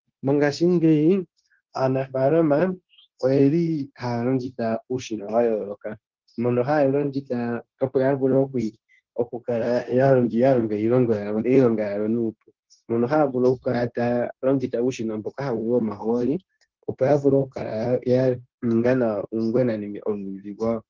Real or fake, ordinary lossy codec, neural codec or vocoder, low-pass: fake; Opus, 16 kbps; codec, 16 kHz, 0.9 kbps, LongCat-Audio-Codec; 7.2 kHz